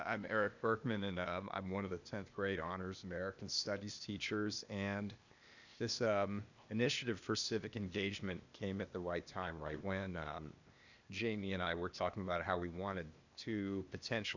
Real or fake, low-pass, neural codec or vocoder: fake; 7.2 kHz; codec, 16 kHz, 0.8 kbps, ZipCodec